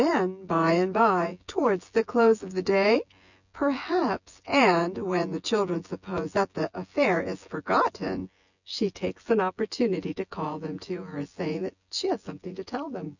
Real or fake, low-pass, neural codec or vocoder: fake; 7.2 kHz; vocoder, 24 kHz, 100 mel bands, Vocos